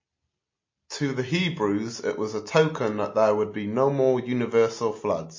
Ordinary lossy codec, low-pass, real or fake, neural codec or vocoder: MP3, 32 kbps; 7.2 kHz; real; none